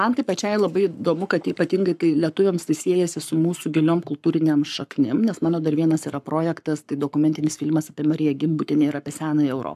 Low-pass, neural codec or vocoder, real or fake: 14.4 kHz; codec, 44.1 kHz, 7.8 kbps, Pupu-Codec; fake